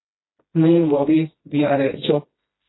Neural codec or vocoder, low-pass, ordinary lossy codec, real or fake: codec, 16 kHz, 1 kbps, FreqCodec, smaller model; 7.2 kHz; AAC, 16 kbps; fake